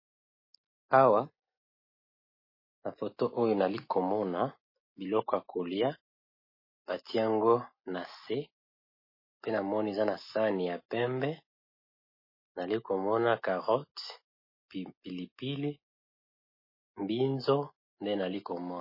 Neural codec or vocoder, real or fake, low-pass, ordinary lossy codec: none; real; 5.4 kHz; MP3, 24 kbps